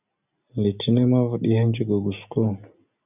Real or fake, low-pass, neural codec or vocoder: real; 3.6 kHz; none